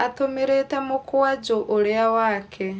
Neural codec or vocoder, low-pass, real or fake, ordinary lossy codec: none; none; real; none